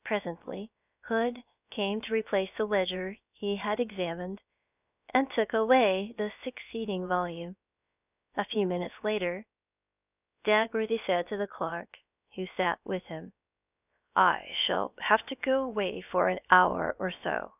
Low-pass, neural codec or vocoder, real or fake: 3.6 kHz; codec, 16 kHz, about 1 kbps, DyCAST, with the encoder's durations; fake